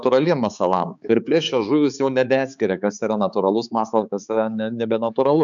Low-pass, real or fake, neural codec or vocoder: 7.2 kHz; fake; codec, 16 kHz, 4 kbps, X-Codec, HuBERT features, trained on balanced general audio